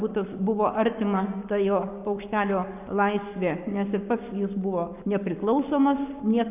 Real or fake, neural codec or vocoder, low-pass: fake; codec, 44.1 kHz, 7.8 kbps, Pupu-Codec; 3.6 kHz